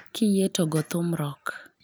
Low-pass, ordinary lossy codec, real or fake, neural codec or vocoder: none; none; real; none